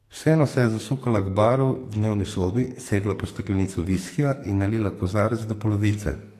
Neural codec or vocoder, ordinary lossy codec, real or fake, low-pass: codec, 44.1 kHz, 2.6 kbps, SNAC; AAC, 64 kbps; fake; 14.4 kHz